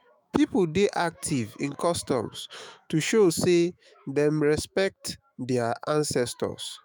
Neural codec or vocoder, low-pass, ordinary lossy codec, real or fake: autoencoder, 48 kHz, 128 numbers a frame, DAC-VAE, trained on Japanese speech; none; none; fake